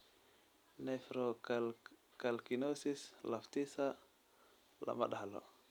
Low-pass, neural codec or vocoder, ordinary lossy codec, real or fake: none; none; none; real